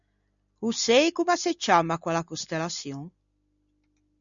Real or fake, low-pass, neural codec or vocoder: real; 7.2 kHz; none